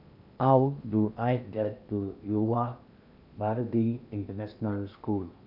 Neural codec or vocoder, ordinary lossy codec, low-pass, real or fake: codec, 16 kHz in and 24 kHz out, 0.8 kbps, FocalCodec, streaming, 65536 codes; none; 5.4 kHz; fake